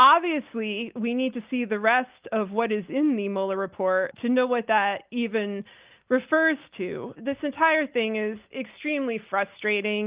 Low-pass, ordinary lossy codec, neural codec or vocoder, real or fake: 3.6 kHz; Opus, 32 kbps; none; real